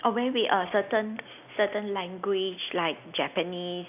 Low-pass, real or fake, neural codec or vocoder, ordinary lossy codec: 3.6 kHz; real; none; none